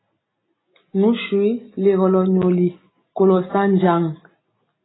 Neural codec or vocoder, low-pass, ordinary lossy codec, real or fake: none; 7.2 kHz; AAC, 16 kbps; real